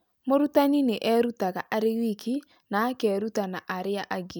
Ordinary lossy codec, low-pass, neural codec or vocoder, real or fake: none; none; none; real